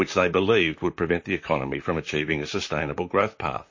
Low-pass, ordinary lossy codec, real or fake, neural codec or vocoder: 7.2 kHz; MP3, 32 kbps; fake; vocoder, 44.1 kHz, 128 mel bands, Pupu-Vocoder